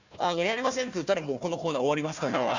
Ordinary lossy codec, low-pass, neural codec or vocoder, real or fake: none; 7.2 kHz; codec, 16 kHz, 1 kbps, FunCodec, trained on Chinese and English, 50 frames a second; fake